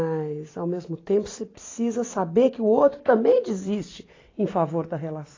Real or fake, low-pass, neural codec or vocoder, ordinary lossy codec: fake; 7.2 kHz; vocoder, 44.1 kHz, 128 mel bands every 256 samples, BigVGAN v2; AAC, 32 kbps